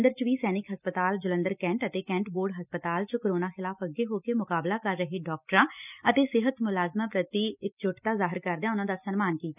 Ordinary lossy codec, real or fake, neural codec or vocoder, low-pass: none; real; none; 3.6 kHz